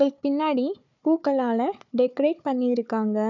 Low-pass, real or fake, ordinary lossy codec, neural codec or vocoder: 7.2 kHz; fake; none; codec, 16 kHz, 4 kbps, FunCodec, trained on Chinese and English, 50 frames a second